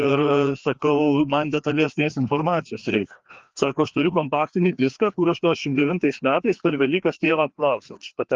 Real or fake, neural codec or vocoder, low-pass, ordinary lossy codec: fake; codec, 16 kHz, 2 kbps, FreqCodec, larger model; 7.2 kHz; Opus, 32 kbps